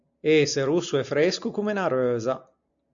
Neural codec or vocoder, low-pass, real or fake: none; 7.2 kHz; real